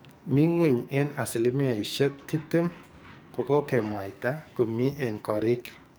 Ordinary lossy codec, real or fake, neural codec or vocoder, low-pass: none; fake; codec, 44.1 kHz, 2.6 kbps, SNAC; none